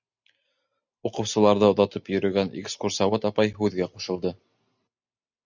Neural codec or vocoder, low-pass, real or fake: none; 7.2 kHz; real